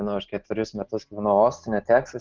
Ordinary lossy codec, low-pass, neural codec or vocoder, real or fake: Opus, 16 kbps; 7.2 kHz; none; real